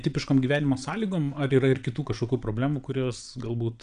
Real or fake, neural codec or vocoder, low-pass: fake; vocoder, 22.05 kHz, 80 mel bands, WaveNeXt; 9.9 kHz